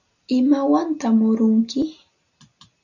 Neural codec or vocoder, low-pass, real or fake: none; 7.2 kHz; real